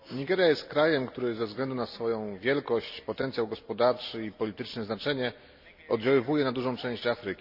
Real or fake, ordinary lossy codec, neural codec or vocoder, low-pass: real; none; none; 5.4 kHz